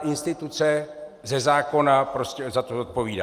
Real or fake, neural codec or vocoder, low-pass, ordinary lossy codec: real; none; 14.4 kHz; Opus, 32 kbps